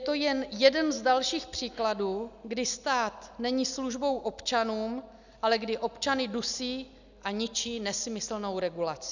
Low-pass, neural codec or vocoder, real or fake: 7.2 kHz; none; real